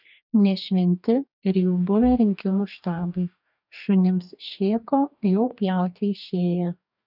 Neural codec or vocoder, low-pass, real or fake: codec, 44.1 kHz, 2.6 kbps, DAC; 5.4 kHz; fake